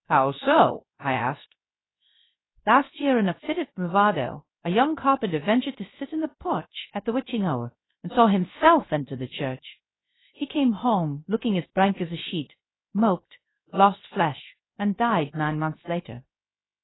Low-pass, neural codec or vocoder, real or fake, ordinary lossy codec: 7.2 kHz; codec, 16 kHz, 0.3 kbps, FocalCodec; fake; AAC, 16 kbps